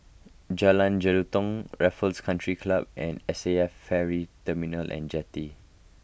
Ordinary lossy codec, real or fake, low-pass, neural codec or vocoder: none; real; none; none